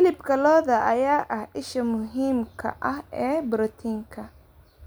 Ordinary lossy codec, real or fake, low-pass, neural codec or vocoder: none; real; none; none